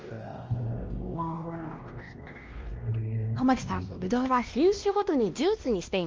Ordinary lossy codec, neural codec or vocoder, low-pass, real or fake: Opus, 24 kbps; codec, 16 kHz, 1 kbps, X-Codec, WavLM features, trained on Multilingual LibriSpeech; 7.2 kHz; fake